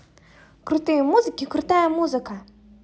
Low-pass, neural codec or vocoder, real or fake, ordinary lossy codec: none; none; real; none